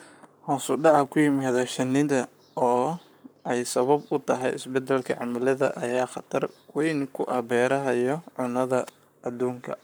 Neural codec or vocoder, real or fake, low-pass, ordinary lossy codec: codec, 44.1 kHz, 7.8 kbps, Pupu-Codec; fake; none; none